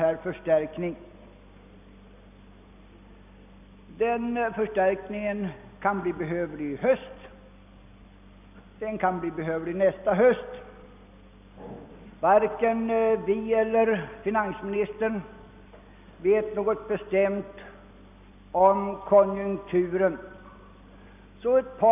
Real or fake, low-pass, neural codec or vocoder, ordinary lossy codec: real; 3.6 kHz; none; none